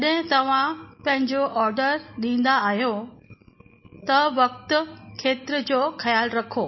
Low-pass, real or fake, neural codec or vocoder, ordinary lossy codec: 7.2 kHz; real; none; MP3, 24 kbps